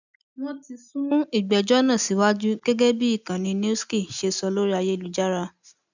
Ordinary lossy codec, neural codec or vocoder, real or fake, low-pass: none; none; real; 7.2 kHz